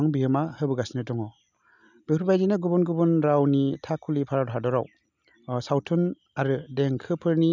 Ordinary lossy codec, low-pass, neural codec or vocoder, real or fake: none; 7.2 kHz; none; real